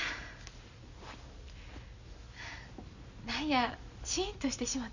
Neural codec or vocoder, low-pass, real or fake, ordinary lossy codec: none; 7.2 kHz; real; none